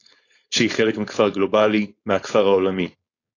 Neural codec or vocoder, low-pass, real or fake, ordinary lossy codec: codec, 16 kHz, 4.8 kbps, FACodec; 7.2 kHz; fake; AAC, 32 kbps